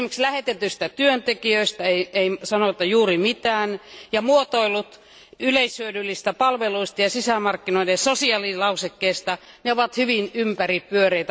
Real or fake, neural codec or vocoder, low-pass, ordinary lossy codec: real; none; none; none